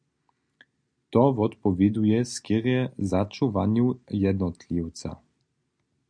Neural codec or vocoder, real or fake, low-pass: none; real; 9.9 kHz